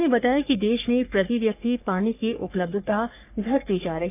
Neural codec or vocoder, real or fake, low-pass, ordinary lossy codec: codec, 44.1 kHz, 3.4 kbps, Pupu-Codec; fake; 3.6 kHz; none